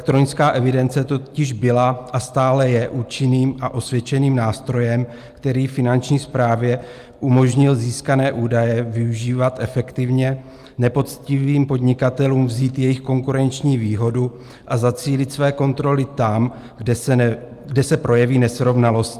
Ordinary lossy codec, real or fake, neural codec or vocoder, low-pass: Opus, 32 kbps; real; none; 14.4 kHz